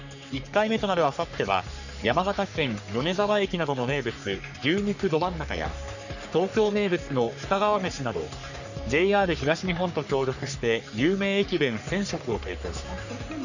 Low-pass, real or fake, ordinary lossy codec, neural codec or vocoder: 7.2 kHz; fake; none; codec, 44.1 kHz, 3.4 kbps, Pupu-Codec